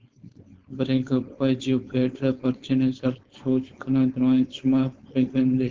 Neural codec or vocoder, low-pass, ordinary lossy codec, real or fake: codec, 16 kHz, 4.8 kbps, FACodec; 7.2 kHz; Opus, 16 kbps; fake